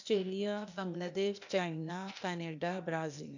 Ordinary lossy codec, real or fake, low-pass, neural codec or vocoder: none; fake; 7.2 kHz; codec, 16 kHz, 0.8 kbps, ZipCodec